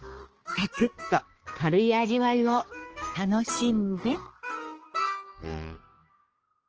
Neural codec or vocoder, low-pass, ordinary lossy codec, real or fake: codec, 16 kHz, 2 kbps, X-Codec, HuBERT features, trained on balanced general audio; 7.2 kHz; Opus, 16 kbps; fake